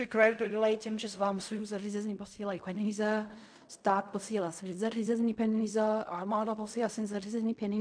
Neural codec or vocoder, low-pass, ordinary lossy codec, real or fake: codec, 16 kHz in and 24 kHz out, 0.4 kbps, LongCat-Audio-Codec, fine tuned four codebook decoder; 9.9 kHz; MP3, 64 kbps; fake